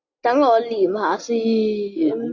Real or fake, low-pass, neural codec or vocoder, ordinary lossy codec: real; 7.2 kHz; none; AAC, 48 kbps